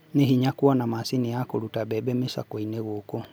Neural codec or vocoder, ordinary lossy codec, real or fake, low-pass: none; none; real; none